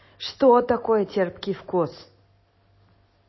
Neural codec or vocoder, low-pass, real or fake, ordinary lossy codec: none; 7.2 kHz; real; MP3, 24 kbps